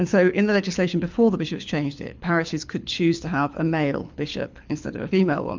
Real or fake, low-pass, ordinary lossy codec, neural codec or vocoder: fake; 7.2 kHz; MP3, 64 kbps; codec, 24 kHz, 6 kbps, HILCodec